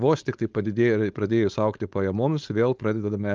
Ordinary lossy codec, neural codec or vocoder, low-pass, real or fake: Opus, 24 kbps; codec, 16 kHz, 4.8 kbps, FACodec; 7.2 kHz; fake